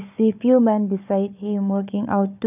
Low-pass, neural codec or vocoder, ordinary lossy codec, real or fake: 3.6 kHz; codec, 16 kHz, 4 kbps, FunCodec, trained on Chinese and English, 50 frames a second; none; fake